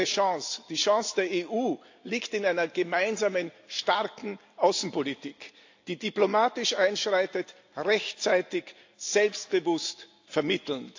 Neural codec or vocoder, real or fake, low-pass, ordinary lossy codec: none; real; 7.2 kHz; AAC, 48 kbps